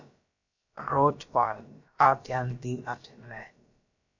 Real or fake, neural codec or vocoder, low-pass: fake; codec, 16 kHz, about 1 kbps, DyCAST, with the encoder's durations; 7.2 kHz